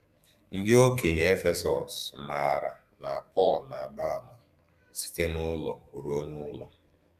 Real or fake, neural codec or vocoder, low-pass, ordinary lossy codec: fake; codec, 32 kHz, 1.9 kbps, SNAC; 14.4 kHz; none